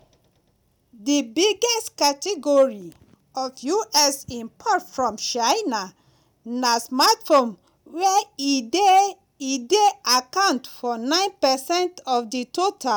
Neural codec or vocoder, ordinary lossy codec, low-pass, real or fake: none; none; none; real